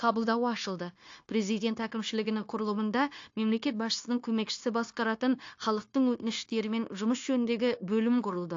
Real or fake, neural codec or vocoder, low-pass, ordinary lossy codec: fake; codec, 16 kHz, 0.9 kbps, LongCat-Audio-Codec; 7.2 kHz; AAC, 48 kbps